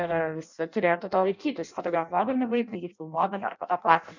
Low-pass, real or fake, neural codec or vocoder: 7.2 kHz; fake; codec, 16 kHz in and 24 kHz out, 0.6 kbps, FireRedTTS-2 codec